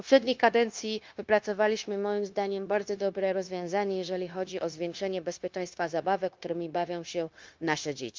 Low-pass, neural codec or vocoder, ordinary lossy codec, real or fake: 7.2 kHz; codec, 16 kHz, 0.9 kbps, LongCat-Audio-Codec; Opus, 32 kbps; fake